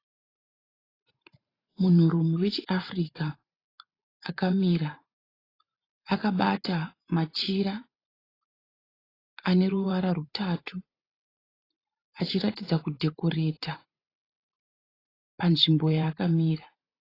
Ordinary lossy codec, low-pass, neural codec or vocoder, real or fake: AAC, 24 kbps; 5.4 kHz; vocoder, 44.1 kHz, 128 mel bands every 512 samples, BigVGAN v2; fake